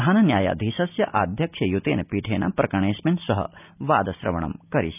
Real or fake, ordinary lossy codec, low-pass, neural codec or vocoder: real; none; 3.6 kHz; none